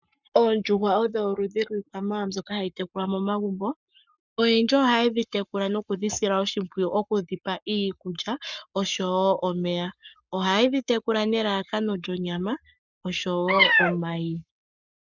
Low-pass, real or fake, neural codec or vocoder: 7.2 kHz; fake; codec, 44.1 kHz, 7.8 kbps, Pupu-Codec